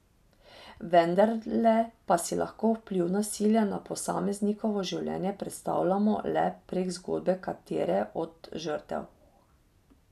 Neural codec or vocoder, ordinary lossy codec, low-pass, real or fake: none; none; 14.4 kHz; real